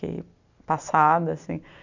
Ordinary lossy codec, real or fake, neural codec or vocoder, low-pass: none; real; none; 7.2 kHz